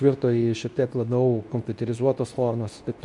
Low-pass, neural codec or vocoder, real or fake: 10.8 kHz; codec, 24 kHz, 0.9 kbps, WavTokenizer, medium speech release version 2; fake